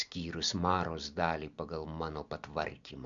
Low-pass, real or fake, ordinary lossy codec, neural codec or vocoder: 7.2 kHz; real; MP3, 64 kbps; none